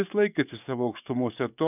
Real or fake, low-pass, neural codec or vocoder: fake; 3.6 kHz; codec, 44.1 kHz, 7.8 kbps, DAC